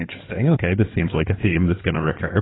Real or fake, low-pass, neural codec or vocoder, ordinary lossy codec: fake; 7.2 kHz; codec, 16 kHz in and 24 kHz out, 2.2 kbps, FireRedTTS-2 codec; AAC, 16 kbps